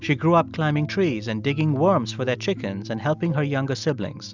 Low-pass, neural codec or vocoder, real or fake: 7.2 kHz; none; real